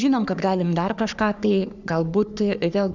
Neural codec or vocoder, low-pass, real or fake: codec, 44.1 kHz, 3.4 kbps, Pupu-Codec; 7.2 kHz; fake